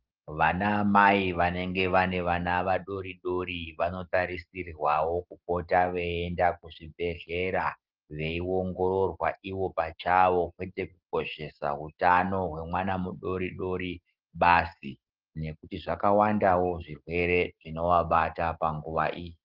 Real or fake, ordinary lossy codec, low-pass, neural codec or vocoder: real; Opus, 16 kbps; 5.4 kHz; none